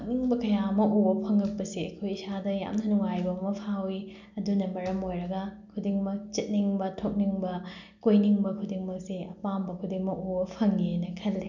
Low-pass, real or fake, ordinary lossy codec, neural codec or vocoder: 7.2 kHz; real; none; none